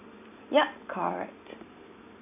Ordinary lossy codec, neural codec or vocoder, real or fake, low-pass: none; none; real; 3.6 kHz